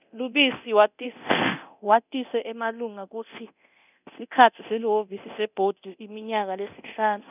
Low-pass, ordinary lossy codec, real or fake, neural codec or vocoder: 3.6 kHz; none; fake; codec, 24 kHz, 0.9 kbps, DualCodec